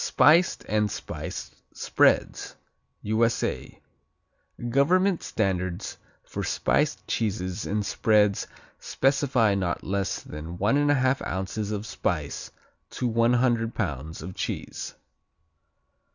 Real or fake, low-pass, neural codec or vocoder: real; 7.2 kHz; none